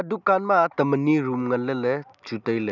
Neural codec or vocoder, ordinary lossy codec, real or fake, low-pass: none; none; real; 7.2 kHz